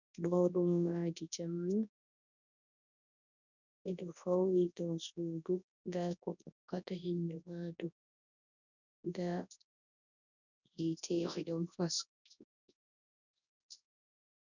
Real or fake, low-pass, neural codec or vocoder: fake; 7.2 kHz; codec, 24 kHz, 0.9 kbps, WavTokenizer, large speech release